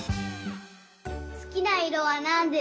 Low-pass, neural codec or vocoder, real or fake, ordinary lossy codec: none; none; real; none